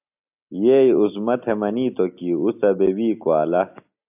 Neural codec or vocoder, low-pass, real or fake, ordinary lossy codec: none; 3.6 kHz; real; AAC, 32 kbps